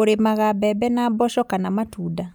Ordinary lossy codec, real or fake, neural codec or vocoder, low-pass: none; real; none; none